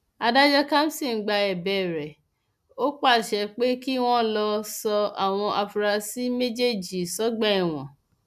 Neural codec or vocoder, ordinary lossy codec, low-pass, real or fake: none; none; 14.4 kHz; real